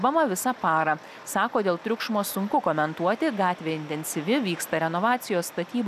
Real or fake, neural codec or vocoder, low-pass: real; none; 14.4 kHz